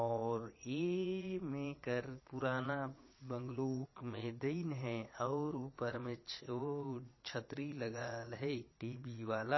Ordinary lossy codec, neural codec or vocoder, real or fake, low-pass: MP3, 24 kbps; vocoder, 22.05 kHz, 80 mel bands, Vocos; fake; 7.2 kHz